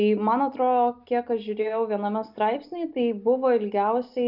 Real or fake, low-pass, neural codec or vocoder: real; 5.4 kHz; none